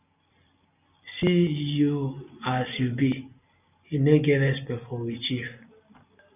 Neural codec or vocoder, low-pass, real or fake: none; 3.6 kHz; real